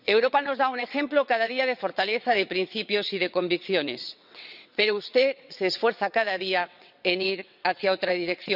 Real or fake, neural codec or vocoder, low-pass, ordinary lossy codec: fake; vocoder, 22.05 kHz, 80 mel bands, WaveNeXt; 5.4 kHz; none